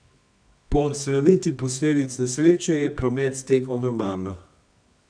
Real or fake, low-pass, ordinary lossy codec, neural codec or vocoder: fake; 9.9 kHz; none; codec, 24 kHz, 0.9 kbps, WavTokenizer, medium music audio release